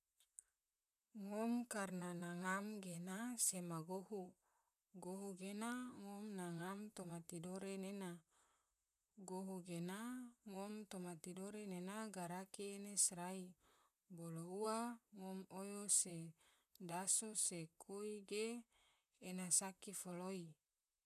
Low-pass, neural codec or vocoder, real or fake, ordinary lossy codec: 14.4 kHz; vocoder, 44.1 kHz, 128 mel bands, Pupu-Vocoder; fake; none